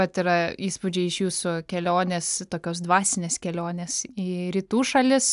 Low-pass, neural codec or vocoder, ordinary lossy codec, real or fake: 10.8 kHz; none; AAC, 96 kbps; real